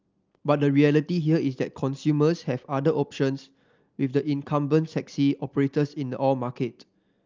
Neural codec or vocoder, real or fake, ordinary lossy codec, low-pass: none; real; Opus, 24 kbps; 7.2 kHz